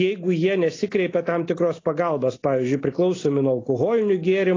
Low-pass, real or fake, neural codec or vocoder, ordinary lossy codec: 7.2 kHz; real; none; AAC, 32 kbps